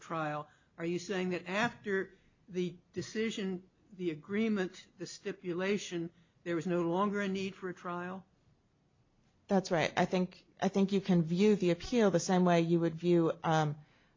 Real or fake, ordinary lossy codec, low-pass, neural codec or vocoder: real; MP3, 48 kbps; 7.2 kHz; none